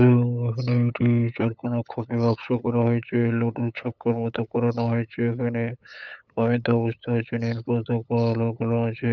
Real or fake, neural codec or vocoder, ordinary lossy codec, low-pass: fake; codec, 16 kHz, 8 kbps, FunCodec, trained on LibriTTS, 25 frames a second; none; 7.2 kHz